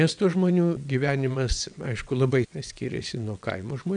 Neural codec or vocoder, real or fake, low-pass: vocoder, 22.05 kHz, 80 mel bands, WaveNeXt; fake; 9.9 kHz